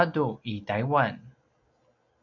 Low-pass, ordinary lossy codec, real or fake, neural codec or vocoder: 7.2 kHz; MP3, 64 kbps; real; none